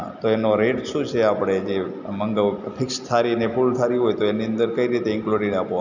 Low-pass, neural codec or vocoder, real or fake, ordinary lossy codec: 7.2 kHz; none; real; none